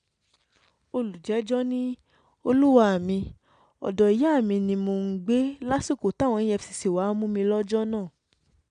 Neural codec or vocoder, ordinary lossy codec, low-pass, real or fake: none; none; 9.9 kHz; real